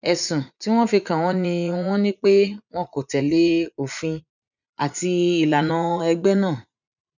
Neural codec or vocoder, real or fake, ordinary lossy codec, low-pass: vocoder, 44.1 kHz, 80 mel bands, Vocos; fake; none; 7.2 kHz